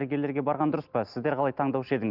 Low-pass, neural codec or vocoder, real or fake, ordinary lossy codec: 5.4 kHz; none; real; Opus, 32 kbps